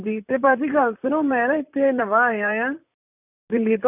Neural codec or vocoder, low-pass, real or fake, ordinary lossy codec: vocoder, 44.1 kHz, 128 mel bands, Pupu-Vocoder; 3.6 kHz; fake; none